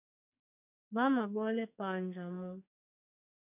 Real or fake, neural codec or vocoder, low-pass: fake; codec, 44.1 kHz, 2.6 kbps, SNAC; 3.6 kHz